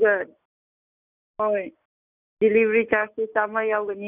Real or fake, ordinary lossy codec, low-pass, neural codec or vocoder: real; none; 3.6 kHz; none